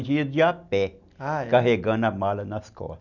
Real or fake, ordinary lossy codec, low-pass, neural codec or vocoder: real; none; 7.2 kHz; none